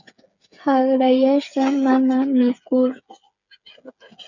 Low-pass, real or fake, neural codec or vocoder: 7.2 kHz; fake; codec, 16 kHz, 8 kbps, FreqCodec, smaller model